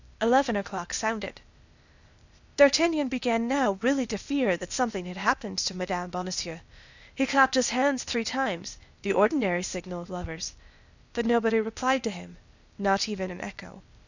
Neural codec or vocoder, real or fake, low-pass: codec, 16 kHz, 0.8 kbps, ZipCodec; fake; 7.2 kHz